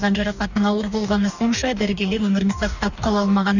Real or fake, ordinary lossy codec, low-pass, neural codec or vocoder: fake; none; 7.2 kHz; codec, 44.1 kHz, 2.6 kbps, DAC